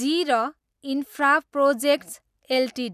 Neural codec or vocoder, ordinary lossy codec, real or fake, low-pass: none; none; real; 14.4 kHz